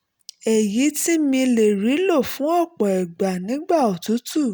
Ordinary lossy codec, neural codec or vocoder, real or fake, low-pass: none; none; real; none